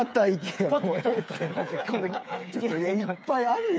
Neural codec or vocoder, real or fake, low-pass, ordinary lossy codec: codec, 16 kHz, 8 kbps, FreqCodec, smaller model; fake; none; none